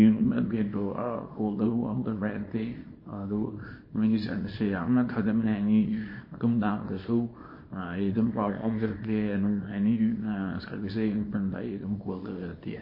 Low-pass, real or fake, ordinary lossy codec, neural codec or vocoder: 5.4 kHz; fake; MP3, 24 kbps; codec, 24 kHz, 0.9 kbps, WavTokenizer, small release